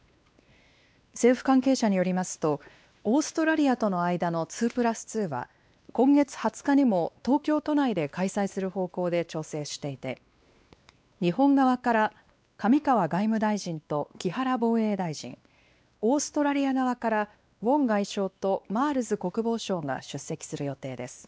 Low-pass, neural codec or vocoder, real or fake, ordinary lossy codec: none; codec, 16 kHz, 2 kbps, X-Codec, WavLM features, trained on Multilingual LibriSpeech; fake; none